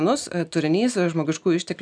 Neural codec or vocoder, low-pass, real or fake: none; 9.9 kHz; real